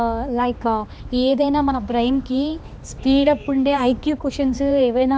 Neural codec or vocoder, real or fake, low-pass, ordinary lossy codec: codec, 16 kHz, 2 kbps, X-Codec, HuBERT features, trained on balanced general audio; fake; none; none